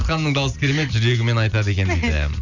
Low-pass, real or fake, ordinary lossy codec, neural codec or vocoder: 7.2 kHz; real; none; none